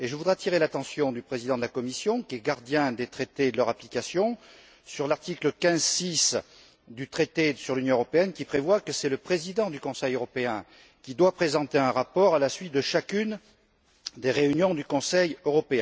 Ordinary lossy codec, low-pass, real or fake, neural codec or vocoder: none; none; real; none